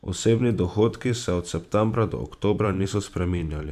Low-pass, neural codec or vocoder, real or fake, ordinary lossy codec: 14.4 kHz; vocoder, 44.1 kHz, 128 mel bands every 512 samples, BigVGAN v2; fake; none